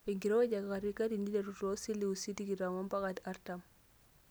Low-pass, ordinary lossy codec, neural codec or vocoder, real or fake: none; none; none; real